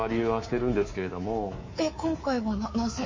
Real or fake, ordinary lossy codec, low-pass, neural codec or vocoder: fake; MP3, 32 kbps; 7.2 kHz; codec, 24 kHz, 3.1 kbps, DualCodec